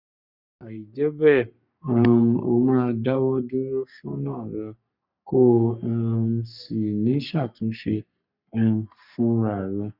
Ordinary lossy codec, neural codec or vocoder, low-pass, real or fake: none; codec, 44.1 kHz, 3.4 kbps, Pupu-Codec; 5.4 kHz; fake